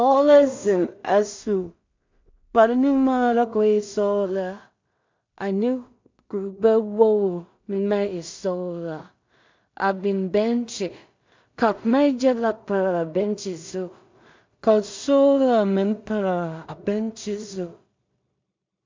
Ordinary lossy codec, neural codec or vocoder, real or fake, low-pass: MP3, 64 kbps; codec, 16 kHz in and 24 kHz out, 0.4 kbps, LongCat-Audio-Codec, two codebook decoder; fake; 7.2 kHz